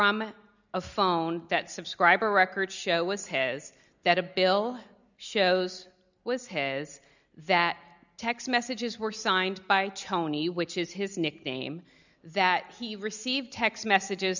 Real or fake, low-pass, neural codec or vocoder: real; 7.2 kHz; none